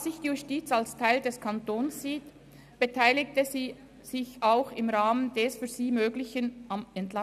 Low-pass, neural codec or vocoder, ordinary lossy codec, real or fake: 14.4 kHz; none; none; real